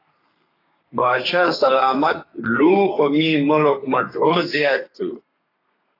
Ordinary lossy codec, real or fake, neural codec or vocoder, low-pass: AAC, 24 kbps; fake; codec, 32 kHz, 1.9 kbps, SNAC; 5.4 kHz